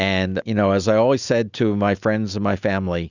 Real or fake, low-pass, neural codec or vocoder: real; 7.2 kHz; none